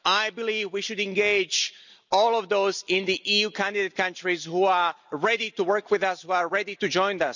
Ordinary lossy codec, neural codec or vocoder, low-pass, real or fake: none; none; 7.2 kHz; real